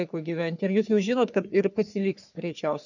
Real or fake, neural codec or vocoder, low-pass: fake; codec, 44.1 kHz, 3.4 kbps, Pupu-Codec; 7.2 kHz